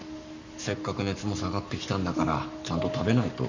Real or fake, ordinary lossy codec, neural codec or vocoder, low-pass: fake; none; codec, 44.1 kHz, 7.8 kbps, Pupu-Codec; 7.2 kHz